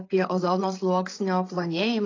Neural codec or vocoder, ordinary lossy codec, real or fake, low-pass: codec, 16 kHz, 8 kbps, FreqCodec, larger model; AAC, 32 kbps; fake; 7.2 kHz